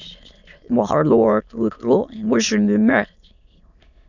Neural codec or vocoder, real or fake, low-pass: autoencoder, 22.05 kHz, a latent of 192 numbers a frame, VITS, trained on many speakers; fake; 7.2 kHz